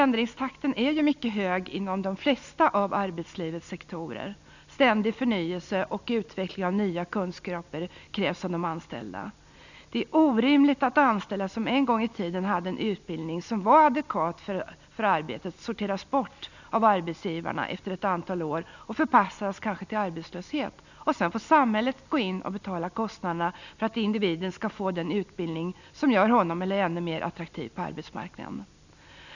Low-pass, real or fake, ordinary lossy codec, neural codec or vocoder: 7.2 kHz; real; none; none